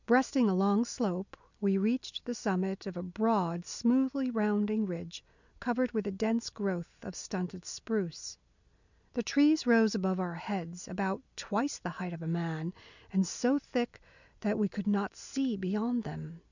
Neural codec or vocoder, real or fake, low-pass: none; real; 7.2 kHz